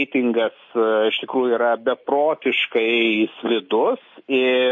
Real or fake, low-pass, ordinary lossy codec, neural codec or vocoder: real; 7.2 kHz; MP3, 32 kbps; none